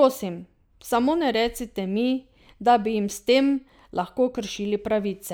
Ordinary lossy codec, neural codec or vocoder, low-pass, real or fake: none; none; none; real